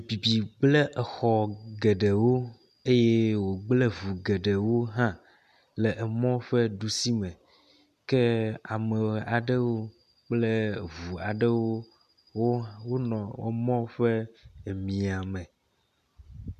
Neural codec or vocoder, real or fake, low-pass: none; real; 14.4 kHz